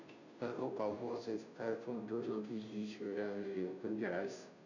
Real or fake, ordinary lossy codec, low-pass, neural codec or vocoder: fake; none; 7.2 kHz; codec, 16 kHz, 0.5 kbps, FunCodec, trained on Chinese and English, 25 frames a second